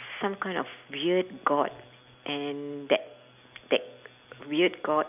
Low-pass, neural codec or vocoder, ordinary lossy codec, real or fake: 3.6 kHz; none; none; real